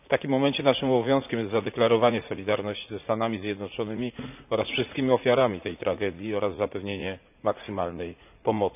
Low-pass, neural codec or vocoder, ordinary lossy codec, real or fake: 3.6 kHz; vocoder, 44.1 kHz, 80 mel bands, Vocos; none; fake